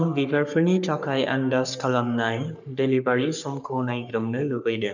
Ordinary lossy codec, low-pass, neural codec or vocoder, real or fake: none; 7.2 kHz; codec, 44.1 kHz, 3.4 kbps, Pupu-Codec; fake